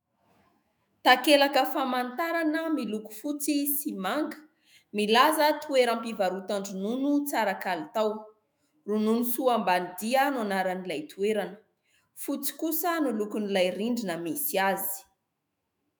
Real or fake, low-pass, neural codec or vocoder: fake; 19.8 kHz; autoencoder, 48 kHz, 128 numbers a frame, DAC-VAE, trained on Japanese speech